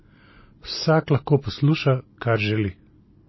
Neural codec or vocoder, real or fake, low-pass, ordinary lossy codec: none; real; 7.2 kHz; MP3, 24 kbps